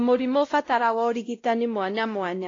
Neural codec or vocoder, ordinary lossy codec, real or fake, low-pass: codec, 16 kHz, 0.5 kbps, X-Codec, WavLM features, trained on Multilingual LibriSpeech; AAC, 32 kbps; fake; 7.2 kHz